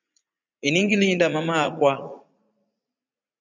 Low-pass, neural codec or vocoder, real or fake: 7.2 kHz; vocoder, 44.1 kHz, 80 mel bands, Vocos; fake